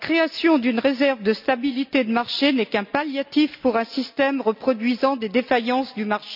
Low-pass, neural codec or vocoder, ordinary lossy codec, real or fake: 5.4 kHz; none; none; real